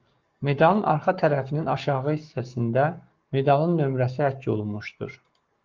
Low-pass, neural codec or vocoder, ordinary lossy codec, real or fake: 7.2 kHz; codec, 44.1 kHz, 7.8 kbps, Pupu-Codec; Opus, 32 kbps; fake